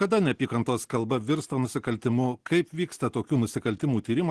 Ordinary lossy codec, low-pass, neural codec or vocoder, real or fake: Opus, 16 kbps; 10.8 kHz; none; real